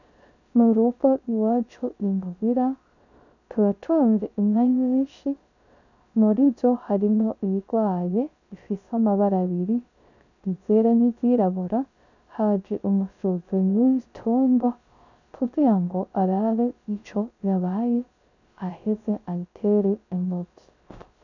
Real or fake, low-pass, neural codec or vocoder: fake; 7.2 kHz; codec, 16 kHz, 0.3 kbps, FocalCodec